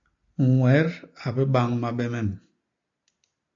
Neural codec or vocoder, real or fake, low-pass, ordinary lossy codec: none; real; 7.2 kHz; AAC, 64 kbps